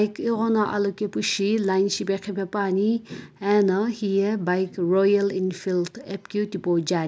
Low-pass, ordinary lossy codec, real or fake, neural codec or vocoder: none; none; real; none